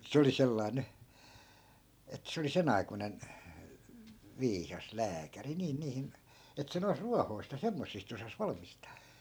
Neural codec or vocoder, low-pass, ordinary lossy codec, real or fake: none; none; none; real